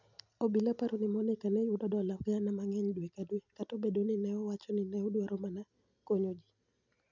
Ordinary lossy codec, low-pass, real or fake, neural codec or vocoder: none; 7.2 kHz; real; none